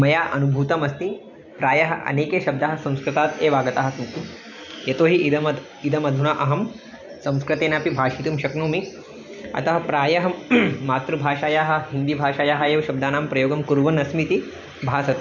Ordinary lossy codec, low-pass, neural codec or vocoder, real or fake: none; 7.2 kHz; none; real